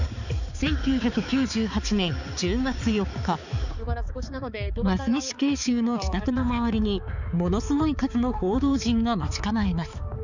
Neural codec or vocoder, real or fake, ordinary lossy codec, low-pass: codec, 16 kHz, 4 kbps, X-Codec, HuBERT features, trained on balanced general audio; fake; none; 7.2 kHz